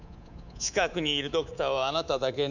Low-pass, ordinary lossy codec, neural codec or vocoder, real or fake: 7.2 kHz; none; codec, 24 kHz, 3.1 kbps, DualCodec; fake